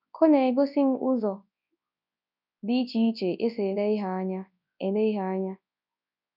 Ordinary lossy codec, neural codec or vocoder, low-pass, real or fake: none; codec, 24 kHz, 0.9 kbps, WavTokenizer, large speech release; 5.4 kHz; fake